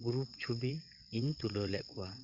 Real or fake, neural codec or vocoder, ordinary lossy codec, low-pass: fake; vocoder, 22.05 kHz, 80 mel bands, WaveNeXt; none; 5.4 kHz